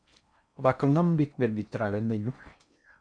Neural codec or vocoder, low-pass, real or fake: codec, 16 kHz in and 24 kHz out, 0.6 kbps, FocalCodec, streaming, 4096 codes; 9.9 kHz; fake